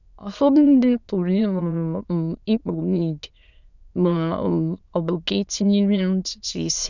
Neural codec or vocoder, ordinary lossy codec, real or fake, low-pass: autoencoder, 22.05 kHz, a latent of 192 numbers a frame, VITS, trained on many speakers; none; fake; 7.2 kHz